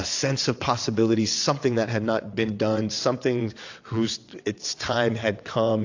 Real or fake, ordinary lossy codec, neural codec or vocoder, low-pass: fake; AAC, 48 kbps; vocoder, 22.05 kHz, 80 mel bands, WaveNeXt; 7.2 kHz